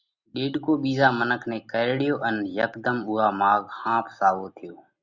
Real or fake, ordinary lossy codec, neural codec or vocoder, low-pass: real; Opus, 64 kbps; none; 7.2 kHz